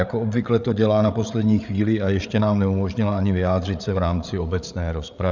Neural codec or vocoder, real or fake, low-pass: codec, 16 kHz, 8 kbps, FreqCodec, larger model; fake; 7.2 kHz